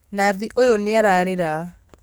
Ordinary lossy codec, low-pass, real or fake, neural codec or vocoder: none; none; fake; codec, 44.1 kHz, 2.6 kbps, SNAC